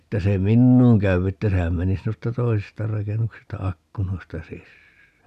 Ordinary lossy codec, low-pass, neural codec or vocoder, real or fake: none; 14.4 kHz; none; real